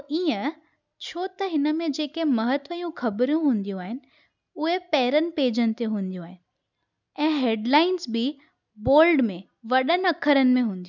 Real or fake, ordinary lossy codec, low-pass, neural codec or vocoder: real; none; 7.2 kHz; none